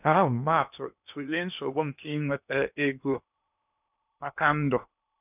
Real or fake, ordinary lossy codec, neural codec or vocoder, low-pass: fake; none; codec, 16 kHz in and 24 kHz out, 0.8 kbps, FocalCodec, streaming, 65536 codes; 3.6 kHz